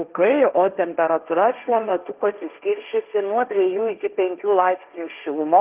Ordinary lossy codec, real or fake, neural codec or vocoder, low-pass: Opus, 32 kbps; fake; codec, 16 kHz, 1.1 kbps, Voila-Tokenizer; 3.6 kHz